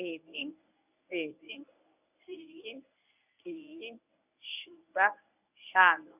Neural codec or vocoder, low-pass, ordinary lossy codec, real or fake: codec, 24 kHz, 0.9 kbps, WavTokenizer, medium speech release version 1; 3.6 kHz; none; fake